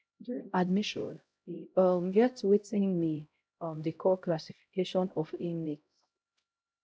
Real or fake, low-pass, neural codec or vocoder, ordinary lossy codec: fake; none; codec, 16 kHz, 0.5 kbps, X-Codec, HuBERT features, trained on LibriSpeech; none